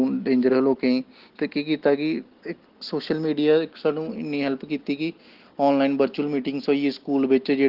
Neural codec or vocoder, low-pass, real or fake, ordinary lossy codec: none; 5.4 kHz; real; Opus, 16 kbps